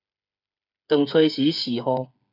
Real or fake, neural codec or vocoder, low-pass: fake; codec, 16 kHz, 8 kbps, FreqCodec, smaller model; 5.4 kHz